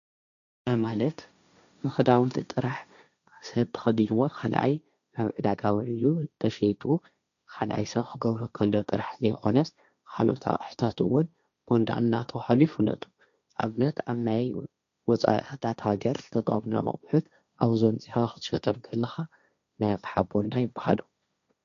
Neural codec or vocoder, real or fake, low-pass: codec, 16 kHz, 1.1 kbps, Voila-Tokenizer; fake; 7.2 kHz